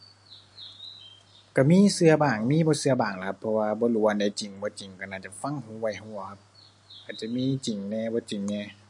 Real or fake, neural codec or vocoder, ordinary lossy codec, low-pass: real; none; MP3, 48 kbps; 10.8 kHz